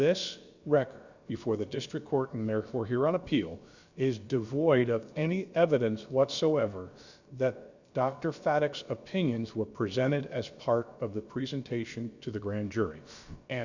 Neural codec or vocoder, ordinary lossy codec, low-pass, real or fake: codec, 16 kHz, about 1 kbps, DyCAST, with the encoder's durations; Opus, 64 kbps; 7.2 kHz; fake